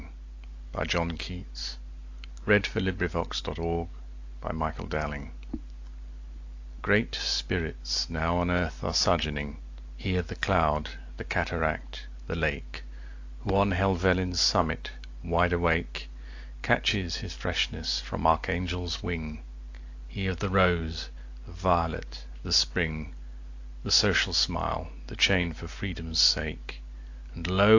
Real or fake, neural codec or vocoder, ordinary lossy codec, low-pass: real; none; AAC, 48 kbps; 7.2 kHz